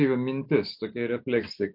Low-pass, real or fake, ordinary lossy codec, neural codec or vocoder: 5.4 kHz; real; MP3, 48 kbps; none